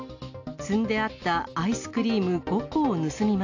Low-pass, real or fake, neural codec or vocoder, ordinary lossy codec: 7.2 kHz; real; none; AAC, 48 kbps